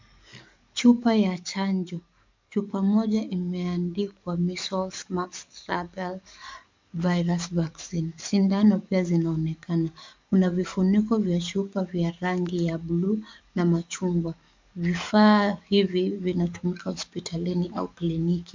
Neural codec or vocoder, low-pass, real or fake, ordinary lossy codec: codec, 44.1 kHz, 7.8 kbps, DAC; 7.2 kHz; fake; MP3, 64 kbps